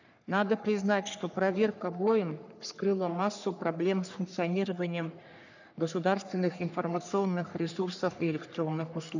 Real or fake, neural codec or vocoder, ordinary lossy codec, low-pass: fake; codec, 44.1 kHz, 3.4 kbps, Pupu-Codec; none; 7.2 kHz